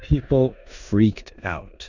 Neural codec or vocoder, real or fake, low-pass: codec, 16 kHz in and 24 kHz out, 0.9 kbps, LongCat-Audio-Codec, four codebook decoder; fake; 7.2 kHz